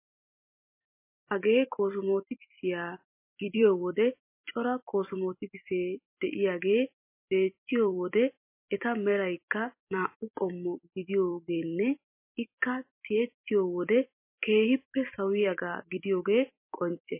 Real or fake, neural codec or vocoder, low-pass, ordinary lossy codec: fake; vocoder, 44.1 kHz, 128 mel bands every 256 samples, BigVGAN v2; 3.6 kHz; MP3, 24 kbps